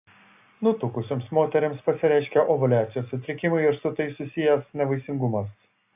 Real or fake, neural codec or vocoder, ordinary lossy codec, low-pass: real; none; AAC, 32 kbps; 3.6 kHz